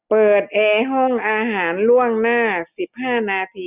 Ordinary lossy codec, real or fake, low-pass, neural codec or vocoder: none; real; 3.6 kHz; none